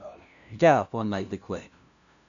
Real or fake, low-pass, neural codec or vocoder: fake; 7.2 kHz; codec, 16 kHz, 0.5 kbps, FunCodec, trained on LibriTTS, 25 frames a second